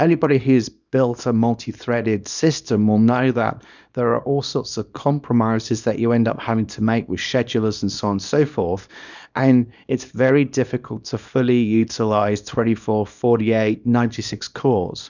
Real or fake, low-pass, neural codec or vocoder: fake; 7.2 kHz; codec, 24 kHz, 0.9 kbps, WavTokenizer, small release